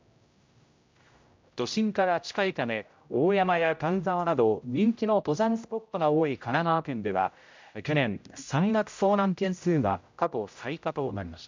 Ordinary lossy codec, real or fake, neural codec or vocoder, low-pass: MP3, 64 kbps; fake; codec, 16 kHz, 0.5 kbps, X-Codec, HuBERT features, trained on general audio; 7.2 kHz